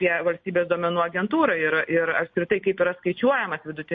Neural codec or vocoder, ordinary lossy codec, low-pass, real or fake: none; MP3, 32 kbps; 7.2 kHz; real